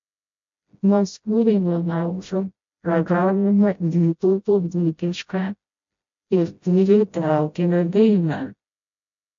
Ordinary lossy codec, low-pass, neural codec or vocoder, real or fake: MP3, 48 kbps; 7.2 kHz; codec, 16 kHz, 0.5 kbps, FreqCodec, smaller model; fake